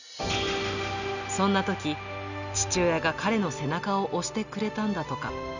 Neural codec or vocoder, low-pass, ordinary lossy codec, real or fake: none; 7.2 kHz; none; real